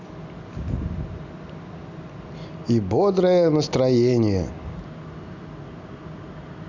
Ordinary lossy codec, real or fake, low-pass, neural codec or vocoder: none; real; 7.2 kHz; none